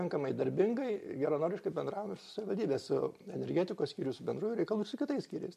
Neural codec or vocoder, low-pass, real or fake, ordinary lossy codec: none; 14.4 kHz; real; MP3, 64 kbps